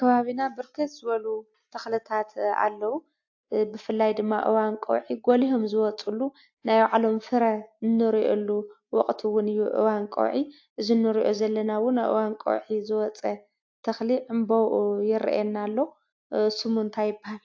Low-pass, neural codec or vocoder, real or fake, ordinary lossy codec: 7.2 kHz; none; real; AAC, 48 kbps